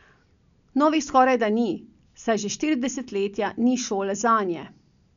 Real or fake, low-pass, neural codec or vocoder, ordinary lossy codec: real; 7.2 kHz; none; none